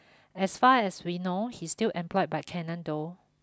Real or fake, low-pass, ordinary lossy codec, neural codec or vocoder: real; none; none; none